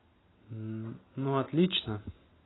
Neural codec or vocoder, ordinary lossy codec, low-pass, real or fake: none; AAC, 16 kbps; 7.2 kHz; real